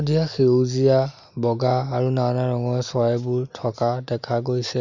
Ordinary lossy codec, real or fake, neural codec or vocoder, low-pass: none; real; none; 7.2 kHz